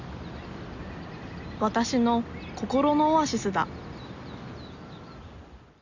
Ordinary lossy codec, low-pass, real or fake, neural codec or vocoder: none; 7.2 kHz; real; none